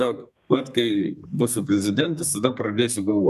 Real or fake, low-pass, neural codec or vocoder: fake; 14.4 kHz; codec, 32 kHz, 1.9 kbps, SNAC